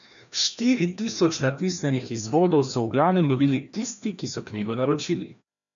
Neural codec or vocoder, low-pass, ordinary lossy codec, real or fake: codec, 16 kHz, 1 kbps, FreqCodec, larger model; 7.2 kHz; none; fake